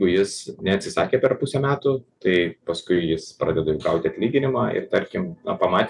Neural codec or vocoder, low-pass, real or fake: none; 10.8 kHz; real